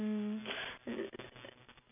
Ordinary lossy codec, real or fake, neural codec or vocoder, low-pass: none; real; none; 3.6 kHz